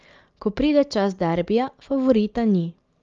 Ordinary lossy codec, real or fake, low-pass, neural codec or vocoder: Opus, 32 kbps; real; 7.2 kHz; none